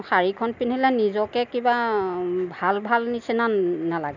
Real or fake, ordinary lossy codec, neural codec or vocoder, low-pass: real; none; none; 7.2 kHz